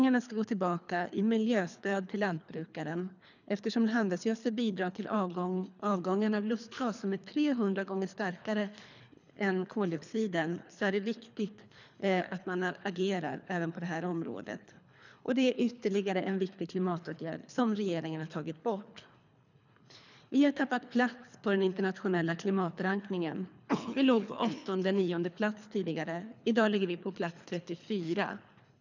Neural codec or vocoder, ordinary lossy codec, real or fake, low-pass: codec, 24 kHz, 3 kbps, HILCodec; none; fake; 7.2 kHz